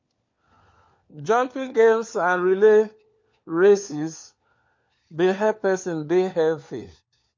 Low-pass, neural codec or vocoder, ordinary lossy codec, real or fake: 7.2 kHz; codec, 16 kHz, 4 kbps, FunCodec, trained on LibriTTS, 50 frames a second; MP3, 48 kbps; fake